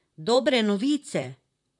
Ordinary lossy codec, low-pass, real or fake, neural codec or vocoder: none; 10.8 kHz; fake; vocoder, 44.1 kHz, 128 mel bands, Pupu-Vocoder